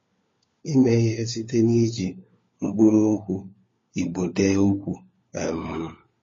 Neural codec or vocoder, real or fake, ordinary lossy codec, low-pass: codec, 16 kHz, 4 kbps, FunCodec, trained on LibriTTS, 50 frames a second; fake; MP3, 32 kbps; 7.2 kHz